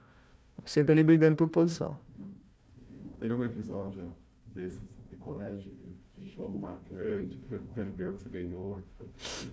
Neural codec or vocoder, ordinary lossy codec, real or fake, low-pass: codec, 16 kHz, 1 kbps, FunCodec, trained on Chinese and English, 50 frames a second; none; fake; none